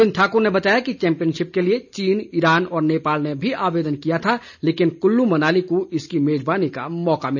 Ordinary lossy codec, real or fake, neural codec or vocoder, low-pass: none; real; none; 7.2 kHz